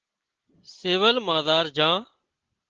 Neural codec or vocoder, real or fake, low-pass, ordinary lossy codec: none; real; 7.2 kHz; Opus, 16 kbps